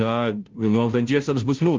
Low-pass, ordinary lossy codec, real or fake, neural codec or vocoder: 7.2 kHz; Opus, 32 kbps; fake; codec, 16 kHz, 0.5 kbps, FunCodec, trained on Chinese and English, 25 frames a second